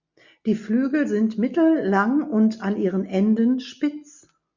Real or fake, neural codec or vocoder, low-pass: real; none; 7.2 kHz